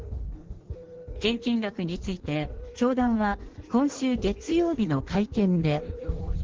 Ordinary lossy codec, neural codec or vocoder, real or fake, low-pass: Opus, 16 kbps; codec, 16 kHz in and 24 kHz out, 1.1 kbps, FireRedTTS-2 codec; fake; 7.2 kHz